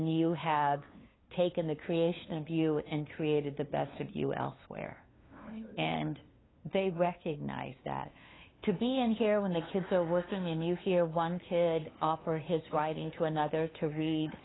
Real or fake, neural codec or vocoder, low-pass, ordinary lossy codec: fake; codec, 16 kHz, 2 kbps, FunCodec, trained on LibriTTS, 25 frames a second; 7.2 kHz; AAC, 16 kbps